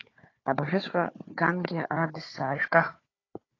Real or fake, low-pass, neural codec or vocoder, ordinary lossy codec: fake; 7.2 kHz; codec, 16 kHz, 4 kbps, FunCodec, trained on Chinese and English, 50 frames a second; AAC, 32 kbps